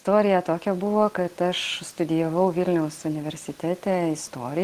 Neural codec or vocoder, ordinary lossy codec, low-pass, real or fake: none; Opus, 16 kbps; 14.4 kHz; real